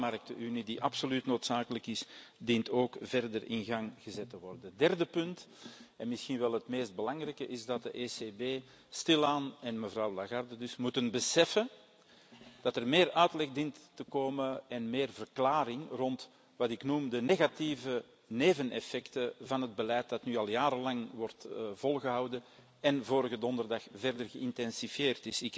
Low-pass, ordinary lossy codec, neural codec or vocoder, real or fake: none; none; none; real